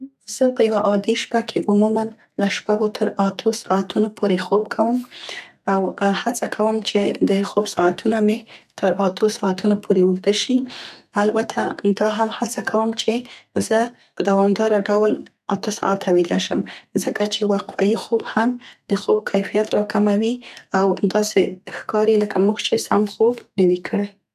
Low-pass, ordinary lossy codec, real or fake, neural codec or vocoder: 14.4 kHz; none; fake; codec, 32 kHz, 1.9 kbps, SNAC